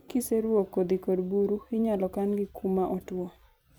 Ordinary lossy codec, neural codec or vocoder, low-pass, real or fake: none; vocoder, 44.1 kHz, 128 mel bands every 256 samples, BigVGAN v2; none; fake